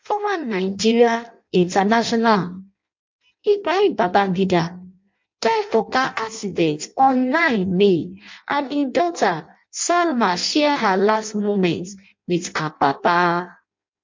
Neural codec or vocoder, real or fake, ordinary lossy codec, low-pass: codec, 16 kHz in and 24 kHz out, 0.6 kbps, FireRedTTS-2 codec; fake; MP3, 48 kbps; 7.2 kHz